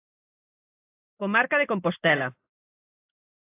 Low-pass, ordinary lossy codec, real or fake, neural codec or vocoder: 3.6 kHz; AAC, 16 kbps; real; none